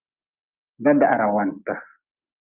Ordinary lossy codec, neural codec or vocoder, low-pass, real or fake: Opus, 24 kbps; none; 3.6 kHz; real